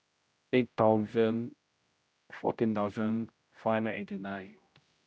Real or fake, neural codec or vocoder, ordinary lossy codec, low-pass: fake; codec, 16 kHz, 0.5 kbps, X-Codec, HuBERT features, trained on general audio; none; none